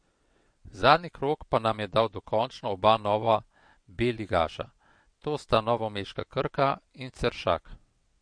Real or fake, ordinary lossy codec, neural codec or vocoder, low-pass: fake; MP3, 48 kbps; vocoder, 22.05 kHz, 80 mel bands, WaveNeXt; 9.9 kHz